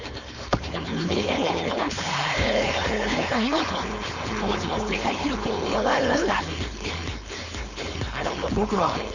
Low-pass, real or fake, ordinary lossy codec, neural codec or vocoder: 7.2 kHz; fake; none; codec, 16 kHz, 4.8 kbps, FACodec